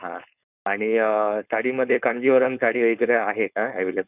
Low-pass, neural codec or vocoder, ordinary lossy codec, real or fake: 3.6 kHz; codec, 16 kHz, 4.8 kbps, FACodec; none; fake